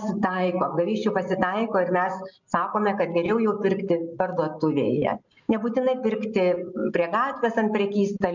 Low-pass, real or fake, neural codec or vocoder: 7.2 kHz; real; none